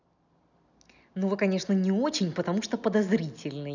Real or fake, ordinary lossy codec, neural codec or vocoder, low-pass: real; none; none; 7.2 kHz